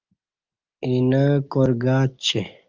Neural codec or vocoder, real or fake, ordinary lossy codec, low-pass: none; real; Opus, 24 kbps; 7.2 kHz